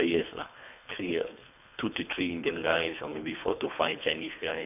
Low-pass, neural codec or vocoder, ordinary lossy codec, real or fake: 3.6 kHz; codec, 24 kHz, 3 kbps, HILCodec; none; fake